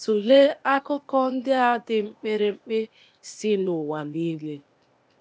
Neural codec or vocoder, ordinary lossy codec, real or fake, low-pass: codec, 16 kHz, 0.8 kbps, ZipCodec; none; fake; none